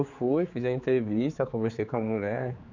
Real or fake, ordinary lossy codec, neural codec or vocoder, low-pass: fake; none; codec, 16 kHz, 4 kbps, X-Codec, HuBERT features, trained on general audio; 7.2 kHz